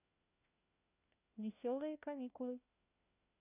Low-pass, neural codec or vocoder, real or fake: 3.6 kHz; codec, 16 kHz, 1 kbps, FunCodec, trained on LibriTTS, 50 frames a second; fake